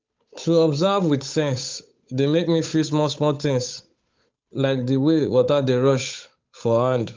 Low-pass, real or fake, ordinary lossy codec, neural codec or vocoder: 7.2 kHz; fake; Opus, 32 kbps; codec, 16 kHz, 8 kbps, FunCodec, trained on Chinese and English, 25 frames a second